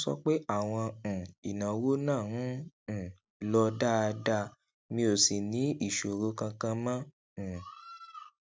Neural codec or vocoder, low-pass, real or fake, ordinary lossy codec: none; none; real; none